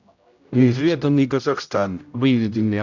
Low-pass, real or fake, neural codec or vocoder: 7.2 kHz; fake; codec, 16 kHz, 0.5 kbps, X-Codec, HuBERT features, trained on general audio